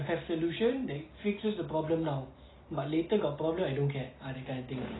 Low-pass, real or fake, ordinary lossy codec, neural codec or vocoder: 7.2 kHz; real; AAC, 16 kbps; none